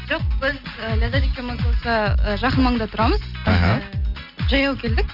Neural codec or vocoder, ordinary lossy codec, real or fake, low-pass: none; none; real; 5.4 kHz